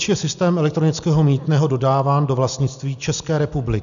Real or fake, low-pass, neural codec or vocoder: real; 7.2 kHz; none